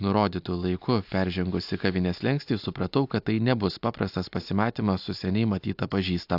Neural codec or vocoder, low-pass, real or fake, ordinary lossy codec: none; 5.4 kHz; real; AAC, 48 kbps